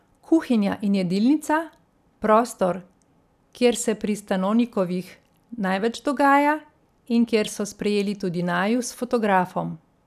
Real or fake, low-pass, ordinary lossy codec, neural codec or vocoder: real; 14.4 kHz; none; none